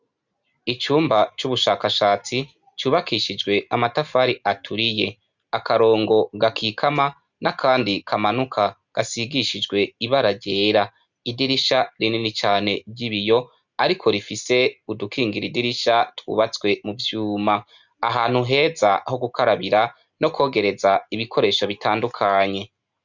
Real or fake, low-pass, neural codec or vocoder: real; 7.2 kHz; none